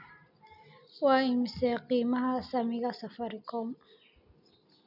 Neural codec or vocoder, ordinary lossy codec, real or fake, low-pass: none; none; real; 5.4 kHz